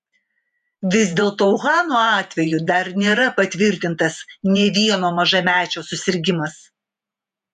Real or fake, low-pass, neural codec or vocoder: fake; 14.4 kHz; vocoder, 48 kHz, 128 mel bands, Vocos